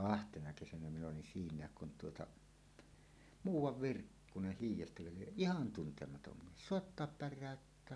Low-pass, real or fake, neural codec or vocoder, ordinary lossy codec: none; real; none; none